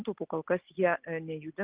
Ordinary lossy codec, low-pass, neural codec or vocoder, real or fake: Opus, 32 kbps; 3.6 kHz; none; real